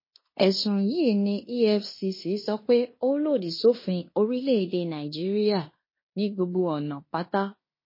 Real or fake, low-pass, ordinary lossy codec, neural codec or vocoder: fake; 5.4 kHz; MP3, 24 kbps; codec, 16 kHz in and 24 kHz out, 0.9 kbps, LongCat-Audio-Codec, fine tuned four codebook decoder